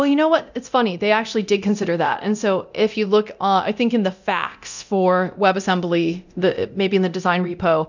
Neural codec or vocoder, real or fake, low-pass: codec, 24 kHz, 0.9 kbps, DualCodec; fake; 7.2 kHz